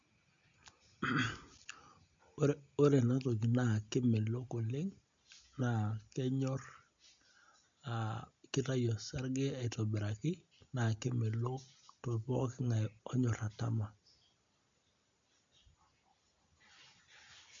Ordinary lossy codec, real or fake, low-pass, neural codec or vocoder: MP3, 64 kbps; real; 7.2 kHz; none